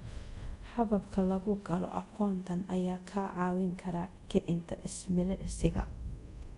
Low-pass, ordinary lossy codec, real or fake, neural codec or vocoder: 10.8 kHz; none; fake; codec, 24 kHz, 0.5 kbps, DualCodec